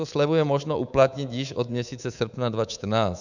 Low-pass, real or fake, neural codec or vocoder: 7.2 kHz; fake; codec, 24 kHz, 3.1 kbps, DualCodec